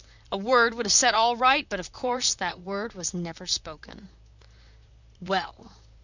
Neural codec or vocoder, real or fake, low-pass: vocoder, 44.1 kHz, 128 mel bands, Pupu-Vocoder; fake; 7.2 kHz